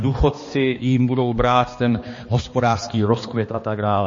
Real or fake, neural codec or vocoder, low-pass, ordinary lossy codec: fake; codec, 16 kHz, 2 kbps, X-Codec, HuBERT features, trained on balanced general audio; 7.2 kHz; MP3, 32 kbps